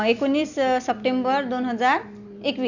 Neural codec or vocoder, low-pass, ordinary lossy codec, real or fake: none; 7.2 kHz; none; real